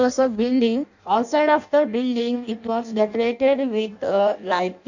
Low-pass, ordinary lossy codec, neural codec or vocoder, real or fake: 7.2 kHz; none; codec, 16 kHz in and 24 kHz out, 0.6 kbps, FireRedTTS-2 codec; fake